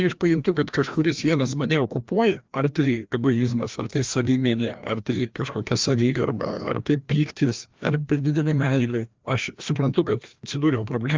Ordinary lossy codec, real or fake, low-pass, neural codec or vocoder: Opus, 32 kbps; fake; 7.2 kHz; codec, 16 kHz, 1 kbps, FreqCodec, larger model